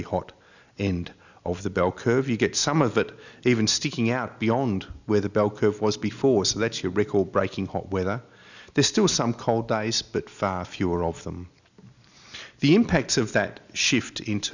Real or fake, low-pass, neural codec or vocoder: real; 7.2 kHz; none